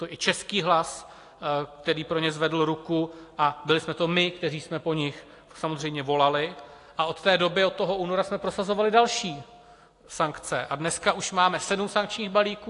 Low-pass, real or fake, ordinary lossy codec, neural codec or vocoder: 10.8 kHz; real; AAC, 48 kbps; none